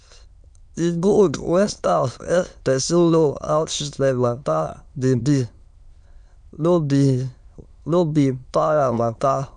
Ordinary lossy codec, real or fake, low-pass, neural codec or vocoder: none; fake; 9.9 kHz; autoencoder, 22.05 kHz, a latent of 192 numbers a frame, VITS, trained on many speakers